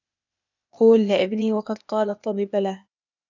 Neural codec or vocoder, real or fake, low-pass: codec, 16 kHz, 0.8 kbps, ZipCodec; fake; 7.2 kHz